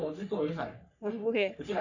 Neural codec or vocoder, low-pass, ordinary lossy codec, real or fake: codec, 44.1 kHz, 3.4 kbps, Pupu-Codec; 7.2 kHz; none; fake